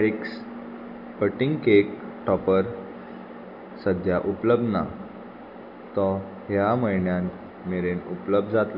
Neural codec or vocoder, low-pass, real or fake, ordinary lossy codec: none; 5.4 kHz; real; none